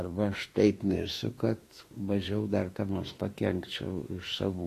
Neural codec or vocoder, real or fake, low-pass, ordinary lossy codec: autoencoder, 48 kHz, 32 numbers a frame, DAC-VAE, trained on Japanese speech; fake; 14.4 kHz; AAC, 48 kbps